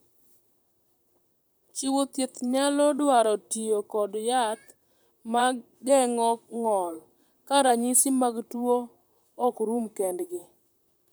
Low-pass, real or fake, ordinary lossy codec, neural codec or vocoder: none; fake; none; vocoder, 44.1 kHz, 128 mel bands, Pupu-Vocoder